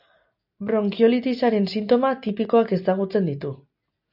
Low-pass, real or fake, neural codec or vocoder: 5.4 kHz; real; none